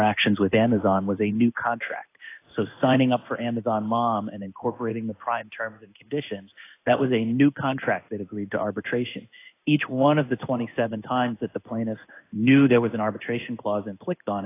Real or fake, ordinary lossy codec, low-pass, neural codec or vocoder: fake; AAC, 24 kbps; 3.6 kHz; codec, 16 kHz in and 24 kHz out, 1 kbps, XY-Tokenizer